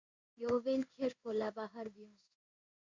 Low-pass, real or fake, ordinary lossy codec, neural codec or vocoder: 7.2 kHz; fake; Opus, 64 kbps; codec, 16 kHz in and 24 kHz out, 1 kbps, XY-Tokenizer